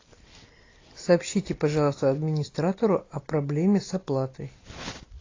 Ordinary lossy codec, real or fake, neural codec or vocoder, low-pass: AAC, 32 kbps; real; none; 7.2 kHz